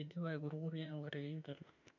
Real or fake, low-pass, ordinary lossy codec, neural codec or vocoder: fake; 7.2 kHz; none; autoencoder, 48 kHz, 32 numbers a frame, DAC-VAE, trained on Japanese speech